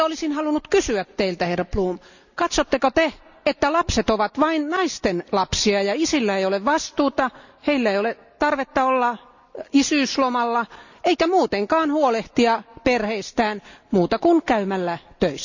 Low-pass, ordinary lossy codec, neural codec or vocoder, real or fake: 7.2 kHz; none; none; real